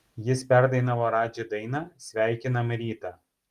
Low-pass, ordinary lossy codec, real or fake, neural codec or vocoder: 14.4 kHz; Opus, 24 kbps; real; none